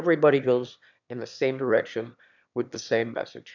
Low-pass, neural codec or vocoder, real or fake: 7.2 kHz; autoencoder, 22.05 kHz, a latent of 192 numbers a frame, VITS, trained on one speaker; fake